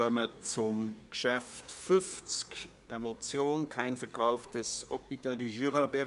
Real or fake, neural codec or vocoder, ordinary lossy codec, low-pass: fake; codec, 24 kHz, 1 kbps, SNAC; none; 10.8 kHz